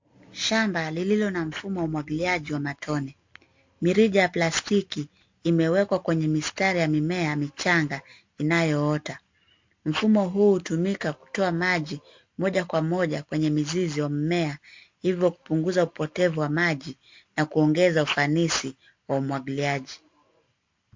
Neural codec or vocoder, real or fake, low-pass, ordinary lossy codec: none; real; 7.2 kHz; MP3, 48 kbps